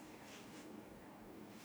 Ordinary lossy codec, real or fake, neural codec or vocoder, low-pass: none; fake; codec, 44.1 kHz, 2.6 kbps, DAC; none